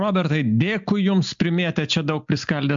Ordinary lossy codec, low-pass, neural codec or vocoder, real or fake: MP3, 64 kbps; 7.2 kHz; none; real